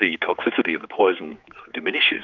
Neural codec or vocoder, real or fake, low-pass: codec, 16 kHz, 4 kbps, X-Codec, HuBERT features, trained on general audio; fake; 7.2 kHz